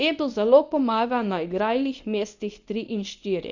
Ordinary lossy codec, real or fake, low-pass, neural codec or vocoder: none; fake; 7.2 kHz; codec, 24 kHz, 0.9 kbps, WavTokenizer, medium speech release version 1